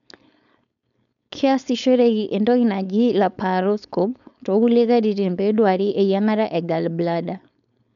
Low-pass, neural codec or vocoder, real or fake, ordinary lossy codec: 7.2 kHz; codec, 16 kHz, 4.8 kbps, FACodec; fake; none